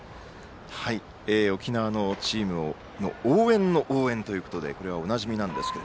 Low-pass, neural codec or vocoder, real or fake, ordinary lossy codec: none; none; real; none